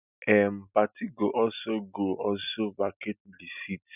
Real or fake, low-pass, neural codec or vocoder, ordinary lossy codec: real; 3.6 kHz; none; none